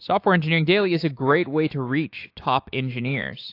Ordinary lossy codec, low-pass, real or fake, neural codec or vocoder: AAC, 32 kbps; 5.4 kHz; fake; autoencoder, 48 kHz, 128 numbers a frame, DAC-VAE, trained on Japanese speech